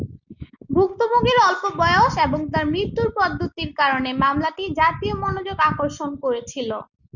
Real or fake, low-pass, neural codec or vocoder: real; 7.2 kHz; none